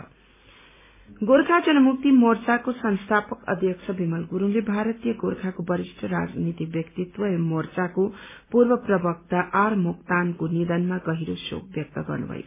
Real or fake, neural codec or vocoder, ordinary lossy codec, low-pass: real; none; MP3, 16 kbps; 3.6 kHz